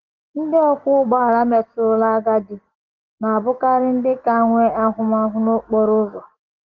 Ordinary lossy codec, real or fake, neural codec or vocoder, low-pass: Opus, 16 kbps; real; none; 7.2 kHz